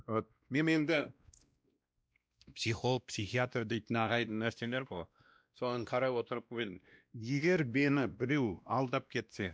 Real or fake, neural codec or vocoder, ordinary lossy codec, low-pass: fake; codec, 16 kHz, 1 kbps, X-Codec, WavLM features, trained on Multilingual LibriSpeech; none; none